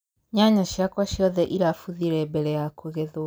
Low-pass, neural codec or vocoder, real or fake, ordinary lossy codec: none; none; real; none